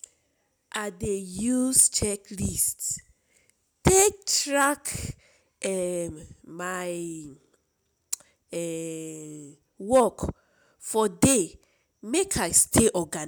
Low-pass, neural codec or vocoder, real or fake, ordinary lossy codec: none; none; real; none